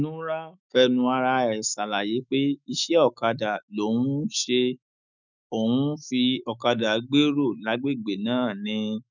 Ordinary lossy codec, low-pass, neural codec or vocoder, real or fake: none; 7.2 kHz; autoencoder, 48 kHz, 128 numbers a frame, DAC-VAE, trained on Japanese speech; fake